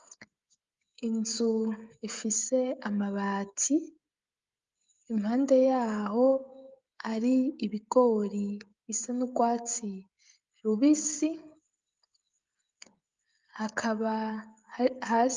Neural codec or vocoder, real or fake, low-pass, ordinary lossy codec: codec, 16 kHz, 16 kbps, FreqCodec, smaller model; fake; 7.2 kHz; Opus, 32 kbps